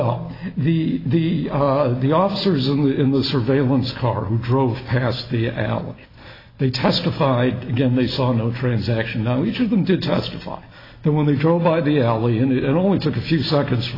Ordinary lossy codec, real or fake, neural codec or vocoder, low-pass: AAC, 24 kbps; real; none; 5.4 kHz